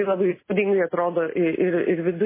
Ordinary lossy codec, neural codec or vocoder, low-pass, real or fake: MP3, 16 kbps; none; 3.6 kHz; real